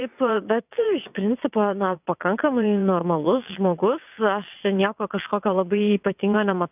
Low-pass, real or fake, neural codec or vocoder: 3.6 kHz; fake; vocoder, 22.05 kHz, 80 mel bands, WaveNeXt